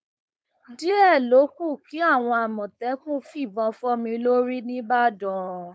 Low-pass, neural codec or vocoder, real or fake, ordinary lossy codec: none; codec, 16 kHz, 4.8 kbps, FACodec; fake; none